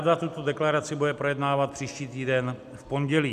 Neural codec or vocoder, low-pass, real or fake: none; 14.4 kHz; real